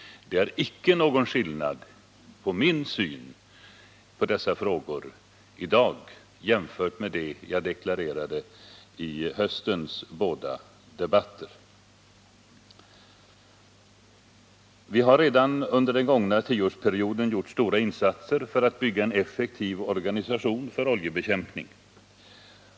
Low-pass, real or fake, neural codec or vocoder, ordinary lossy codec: none; real; none; none